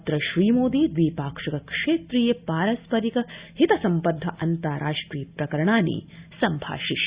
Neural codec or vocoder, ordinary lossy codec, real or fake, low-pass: none; Opus, 64 kbps; real; 3.6 kHz